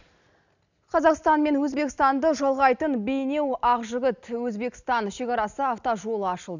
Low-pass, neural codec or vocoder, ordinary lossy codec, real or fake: 7.2 kHz; none; none; real